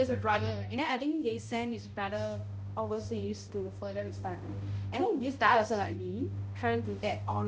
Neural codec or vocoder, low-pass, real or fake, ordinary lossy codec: codec, 16 kHz, 0.5 kbps, X-Codec, HuBERT features, trained on balanced general audio; none; fake; none